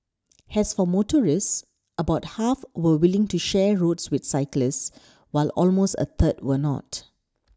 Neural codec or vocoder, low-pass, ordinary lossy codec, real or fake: none; none; none; real